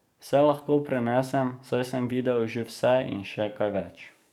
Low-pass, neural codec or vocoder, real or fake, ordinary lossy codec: 19.8 kHz; codec, 44.1 kHz, 7.8 kbps, DAC; fake; none